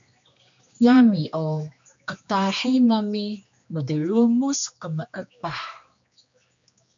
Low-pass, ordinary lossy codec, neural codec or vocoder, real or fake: 7.2 kHz; MP3, 64 kbps; codec, 16 kHz, 2 kbps, X-Codec, HuBERT features, trained on general audio; fake